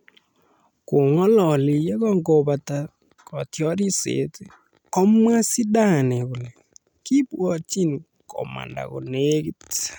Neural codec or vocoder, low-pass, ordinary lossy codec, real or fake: none; none; none; real